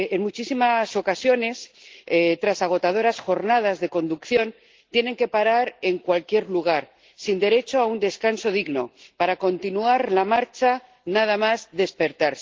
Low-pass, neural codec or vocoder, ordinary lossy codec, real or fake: 7.2 kHz; none; Opus, 16 kbps; real